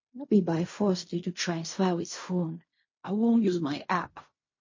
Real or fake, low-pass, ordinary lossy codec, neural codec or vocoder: fake; 7.2 kHz; MP3, 32 kbps; codec, 16 kHz in and 24 kHz out, 0.4 kbps, LongCat-Audio-Codec, fine tuned four codebook decoder